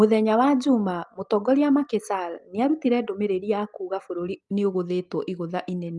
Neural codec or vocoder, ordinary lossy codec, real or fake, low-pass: none; Opus, 32 kbps; real; 10.8 kHz